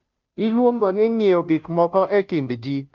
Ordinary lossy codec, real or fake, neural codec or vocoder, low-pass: Opus, 24 kbps; fake; codec, 16 kHz, 0.5 kbps, FunCodec, trained on Chinese and English, 25 frames a second; 7.2 kHz